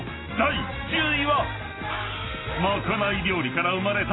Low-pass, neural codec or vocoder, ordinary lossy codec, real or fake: 7.2 kHz; none; AAC, 16 kbps; real